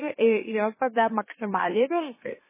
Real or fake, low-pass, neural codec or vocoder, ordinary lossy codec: fake; 3.6 kHz; autoencoder, 44.1 kHz, a latent of 192 numbers a frame, MeloTTS; MP3, 16 kbps